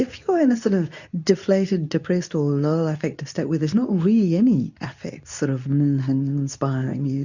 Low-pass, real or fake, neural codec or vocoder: 7.2 kHz; fake; codec, 24 kHz, 0.9 kbps, WavTokenizer, medium speech release version 2